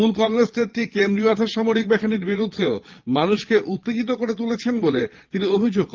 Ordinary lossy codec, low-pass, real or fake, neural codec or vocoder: Opus, 32 kbps; 7.2 kHz; fake; vocoder, 22.05 kHz, 80 mel bands, Vocos